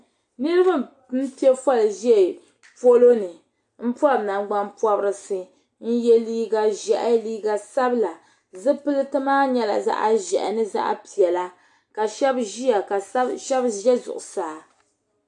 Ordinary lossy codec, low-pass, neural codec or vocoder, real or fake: AAC, 64 kbps; 10.8 kHz; none; real